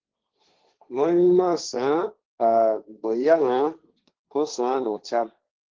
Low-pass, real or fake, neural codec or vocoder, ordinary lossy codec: 7.2 kHz; fake; codec, 16 kHz, 1.1 kbps, Voila-Tokenizer; Opus, 16 kbps